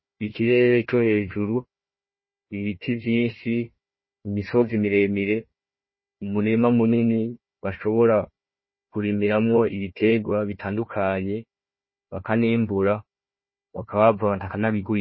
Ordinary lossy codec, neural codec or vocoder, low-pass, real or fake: MP3, 24 kbps; codec, 16 kHz, 1 kbps, FunCodec, trained on Chinese and English, 50 frames a second; 7.2 kHz; fake